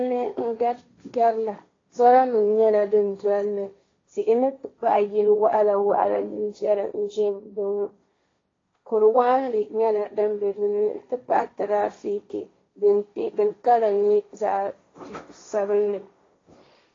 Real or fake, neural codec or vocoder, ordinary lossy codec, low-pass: fake; codec, 16 kHz, 1.1 kbps, Voila-Tokenizer; AAC, 32 kbps; 7.2 kHz